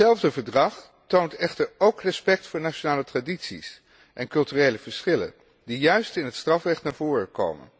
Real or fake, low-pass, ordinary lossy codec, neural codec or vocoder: real; none; none; none